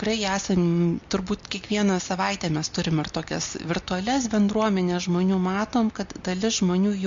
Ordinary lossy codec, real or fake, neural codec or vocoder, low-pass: MP3, 48 kbps; real; none; 7.2 kHz